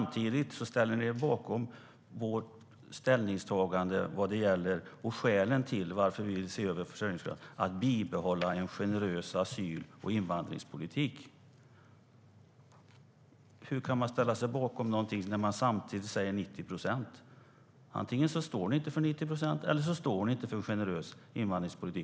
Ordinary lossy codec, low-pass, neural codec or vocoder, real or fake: none; none; none; real